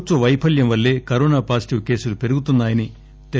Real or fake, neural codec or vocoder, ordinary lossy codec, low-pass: real; none; none; none